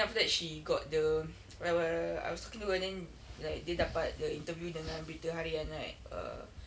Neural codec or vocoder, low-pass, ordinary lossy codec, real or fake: none; none; none; real